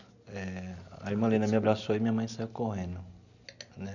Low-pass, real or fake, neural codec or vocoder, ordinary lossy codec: 7.2 kHz; real; none; none